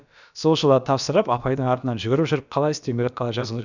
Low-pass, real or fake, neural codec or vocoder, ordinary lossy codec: 7.2 kHz; fake; codec, 16 kHz, about 1 kbps, DyCAST, with the encoder's durations; none